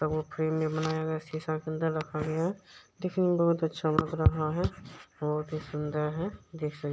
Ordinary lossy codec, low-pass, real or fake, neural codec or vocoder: none; none; real; none